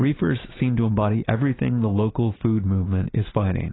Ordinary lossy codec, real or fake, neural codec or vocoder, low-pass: AAC, 16 kbps; real; none; 7.2 kHz